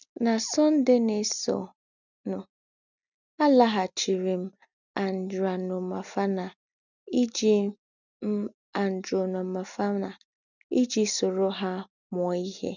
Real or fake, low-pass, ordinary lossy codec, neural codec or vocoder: real; 7.2 kHz; none; none